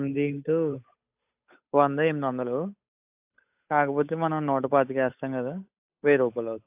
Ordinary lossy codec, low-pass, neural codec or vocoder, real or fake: AAC, 32 kbps; 3.6 kHz; codec, 16 kHz, 8 kbps, FunCodec, trained on Chinese and English, 25 frames a second; fake